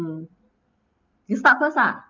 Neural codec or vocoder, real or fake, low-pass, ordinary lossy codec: none; real; 7.2 kHz; Opus, 24 kbps